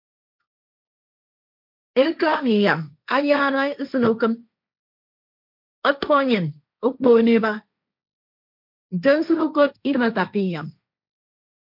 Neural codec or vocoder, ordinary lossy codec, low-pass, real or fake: codec, 16 kHz, 1.1 kbps, Voila-Tokenizer; MP3, 48 kbps; 5.4 kHz; fake